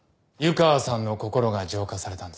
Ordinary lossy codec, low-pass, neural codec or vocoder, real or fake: none; none; none; real